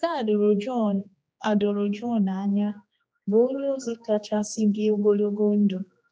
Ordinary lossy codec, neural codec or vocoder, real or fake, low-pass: none; codec, 16 kHz, 2 kbps, X-Codec, HuBERT features, trained on general audio; fake; none